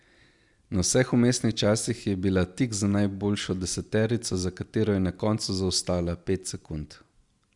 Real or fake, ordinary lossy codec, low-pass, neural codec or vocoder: real; Opus, 64 kbps; 10.8 kHz; none